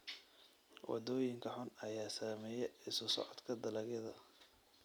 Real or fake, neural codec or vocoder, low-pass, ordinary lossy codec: real; none; none; none